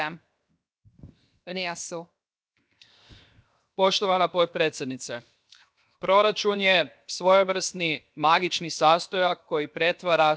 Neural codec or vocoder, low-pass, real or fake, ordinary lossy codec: codec, 16 kHz, 0.7 kbps, FocalCodec; none; fake; none